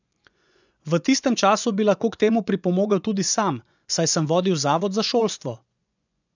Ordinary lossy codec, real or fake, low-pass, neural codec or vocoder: none; fake; 7.2 kHz; vocoder, 24 kHz, 100 mel bands, Vocos